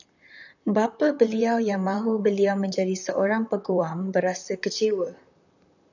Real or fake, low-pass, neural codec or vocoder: fake; 7.2 kHz; vocoder, 44.1 kHz, 128 mel bands, Pupu-Vocoder